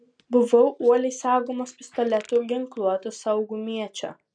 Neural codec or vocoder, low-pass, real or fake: none; 9.9 kHz; real